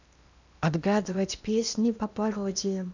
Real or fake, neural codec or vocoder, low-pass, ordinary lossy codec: fake; codec, 16 kHz in and 24 kHz out, 0.8 kbps, FocalCodec, streaming, 65536 codes; 7.2 kHz; none